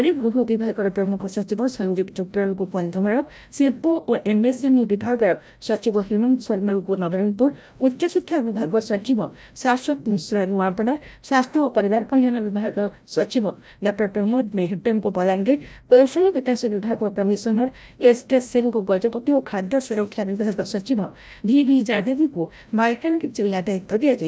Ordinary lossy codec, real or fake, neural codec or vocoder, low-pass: none; fake; codec, 16 kHz, 0.5 kbps, FreqCodec, larger model; none